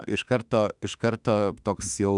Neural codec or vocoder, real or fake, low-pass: autoencoder, 48 kHz, 32 numbers a frame, DAC-VAE, trained on Japanese speech; fake; 10.8 kHz